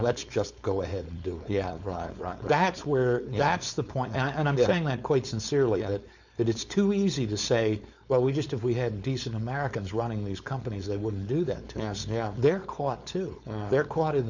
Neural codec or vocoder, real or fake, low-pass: codec, 16 kHz, 4.8 kbps, FACodec; fake; 7.2 kHz